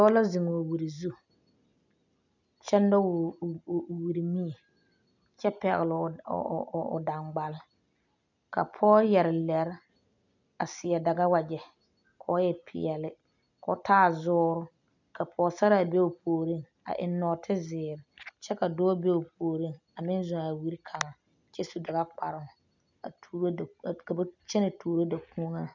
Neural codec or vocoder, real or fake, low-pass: none; real; 7.2 kHz